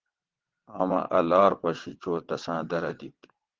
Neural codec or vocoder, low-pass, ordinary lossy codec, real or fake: vocoder, 22.05 kHz, 80 mel bands, WaveNeXt; 7.2 kHz; Opus, 16 kbps; fake